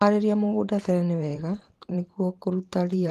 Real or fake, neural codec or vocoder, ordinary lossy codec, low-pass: fake; vocoder, 44.1 kHz, 128 mel bands every 512 samples, BigVGAN v2; Opus, 24 kbps; 14.4 kHz